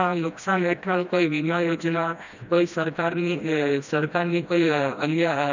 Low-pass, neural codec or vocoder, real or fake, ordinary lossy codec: 7.2 kHz; codec, 16 kHz, 1 kbps, FreqCodec, smaller model; fake; none